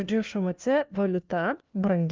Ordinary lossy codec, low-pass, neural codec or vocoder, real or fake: Opus, 32 kbps; 7.2 kHz; codec, 16 kHz, 1 kbps, FunCodec, trained on LibriTTS, 50 frames a second; fake